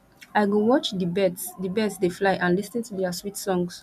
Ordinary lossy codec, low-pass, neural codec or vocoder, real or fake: none; 14.4 kHz; none; real